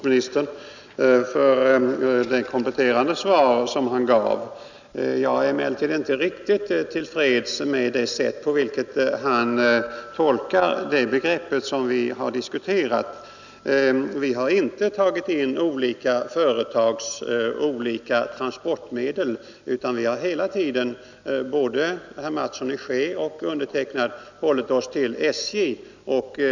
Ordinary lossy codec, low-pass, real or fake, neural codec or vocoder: none; 7.2 kHz; real; none